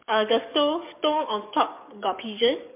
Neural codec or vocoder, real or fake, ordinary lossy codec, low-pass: codec, 44.1 kHz, 7.8 kbps, DAC; fake; MP3, 32 kbps; 3.6 kHz